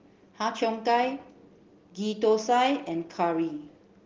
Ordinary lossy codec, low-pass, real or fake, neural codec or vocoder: Opus, 16 kbps; 7.2 kHz; real; none